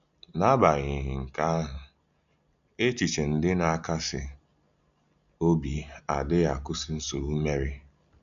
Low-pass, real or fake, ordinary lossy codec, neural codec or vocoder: 7.2 kHz; real; none; none